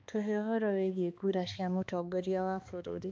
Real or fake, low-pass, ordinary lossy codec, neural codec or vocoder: fake; none; none; codec, 16 kHz, 2 kbps, X-Codec, HuBERT features, trained on balanced general audio